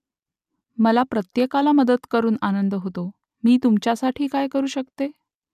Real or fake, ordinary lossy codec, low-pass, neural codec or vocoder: real; none; 14.4 kHz; none